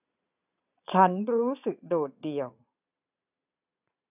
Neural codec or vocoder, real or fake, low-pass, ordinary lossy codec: vocoder, 22.05 kHz, 80 mel bands, Vocos; fake; 3.6 kHz; none